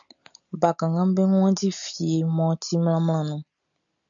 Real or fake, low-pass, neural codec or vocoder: real; 7.2 kHz; none